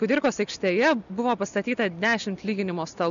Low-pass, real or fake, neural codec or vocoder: 7.2 kHz; real; none